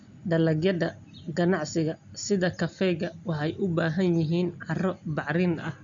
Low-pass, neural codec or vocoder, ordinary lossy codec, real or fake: 7.2 kHz; none; MP3, 64 kbps; real